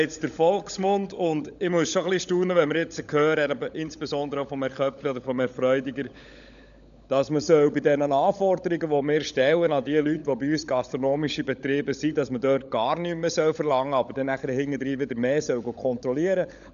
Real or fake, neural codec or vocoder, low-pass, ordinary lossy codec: fake; codec, 16 kHz, 16 kbps, FunCodec, trained on LibriTTS, 50 frames a second; 7.2 kHz; none